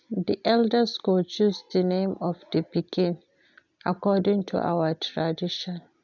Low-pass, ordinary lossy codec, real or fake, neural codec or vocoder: 7.2 kHz; none; real; none